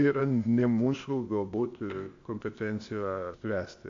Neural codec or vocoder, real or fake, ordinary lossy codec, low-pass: codec, 16 kHz, 0.8 kbps, ZipCodec; fake; AAC, 48 kbps; 7.2 kHz